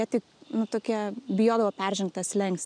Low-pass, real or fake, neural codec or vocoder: 9.9 kHz; real; none